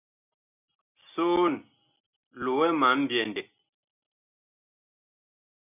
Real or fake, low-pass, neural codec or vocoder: real; 3.6 kHz; none